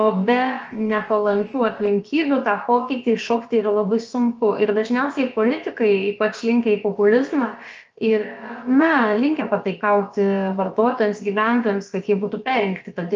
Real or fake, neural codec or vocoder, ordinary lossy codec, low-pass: fake; codec, 16 kHz, about 1 kbps, DyCAST, with the encoder's durations; Opus, 24 kbps; 7.2 kHz